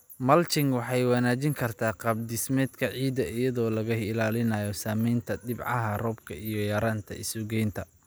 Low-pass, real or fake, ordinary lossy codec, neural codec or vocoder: none; real; none; none